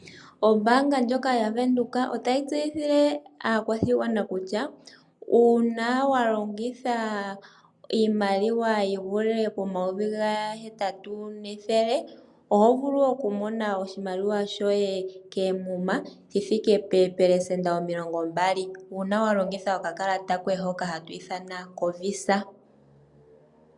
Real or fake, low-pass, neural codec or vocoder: real; 10.8 kHz; none